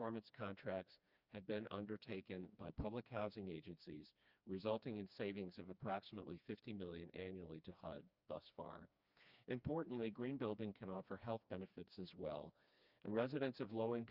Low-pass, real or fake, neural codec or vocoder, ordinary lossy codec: 5.4 kHz; fake; codec, 16 kHz, 2 kbps, FreqCodec, smaller model; Opus, 64 kbps